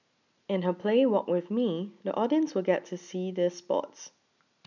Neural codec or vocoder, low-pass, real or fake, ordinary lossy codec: none; 7.2 kHz; real; none